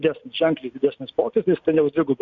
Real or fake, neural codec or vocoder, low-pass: real; none; 7.2 kHz